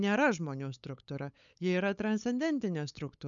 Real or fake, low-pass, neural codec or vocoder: fake; 7.2 kHz; codec, 16 kHz, 8 kbps, FunCodec, trained on LibriTTS, 25 frames a second